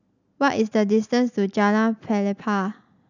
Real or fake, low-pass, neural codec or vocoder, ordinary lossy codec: real; 7.2 kHz; none; none